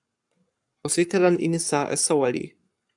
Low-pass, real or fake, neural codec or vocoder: 10.8 kHz; fake; codec, 44.1 kHz, 7.8 kbps, Pupu-Codec